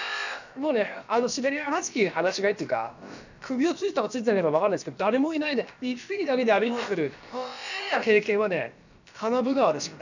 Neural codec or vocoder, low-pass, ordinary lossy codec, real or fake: codec, 16 kHz, about 1 kbps, DyCAST, with the encoder's durations; 7.2 kHz; none; fake